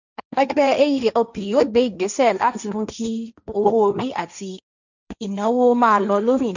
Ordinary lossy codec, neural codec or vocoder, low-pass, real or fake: none; codec, 16 kHz, 1.1 kbps, Voila-Tokenizer; 7.2 kHz; fake